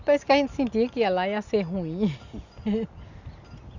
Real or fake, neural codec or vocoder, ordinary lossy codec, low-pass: real; none; none; 7.2 kHz